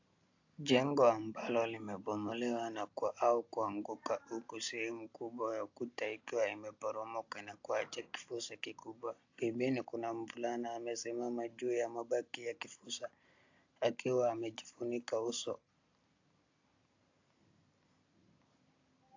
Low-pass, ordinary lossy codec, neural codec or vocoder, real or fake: 7.2 kHz; AAC, 48 kbps; none; real